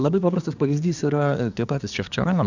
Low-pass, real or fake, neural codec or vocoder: 7.2 kHz; fake; codec, 24 kHz, 1 kbps, SNAC